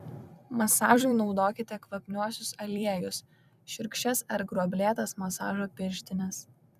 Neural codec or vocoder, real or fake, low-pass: vocoder, 44.1 kHz, 128 mel bands every 256 samples, BigVGAN v2; fake; 14.4 kHz